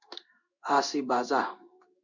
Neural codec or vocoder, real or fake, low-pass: codec, 16 kHz in and 24 kHz out, 1 kbps, XY-Tokenizer; fake; 7.2 kHz